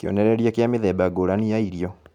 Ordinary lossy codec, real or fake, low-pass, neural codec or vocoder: none; real; 19.8 kHz; none